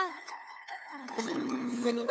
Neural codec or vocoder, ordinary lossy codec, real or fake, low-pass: codec, 16 kHz, 2 kbps, FunCodec, trained on LibriTTS, 25 frames a second; none; fake; none